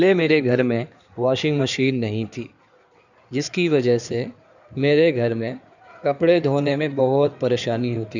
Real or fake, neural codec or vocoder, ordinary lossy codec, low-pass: fake; codec, 16 kHz in and 24 kHz out, 2.2 kbps, FireRedTTS-2 codec; none; 7.2 kHz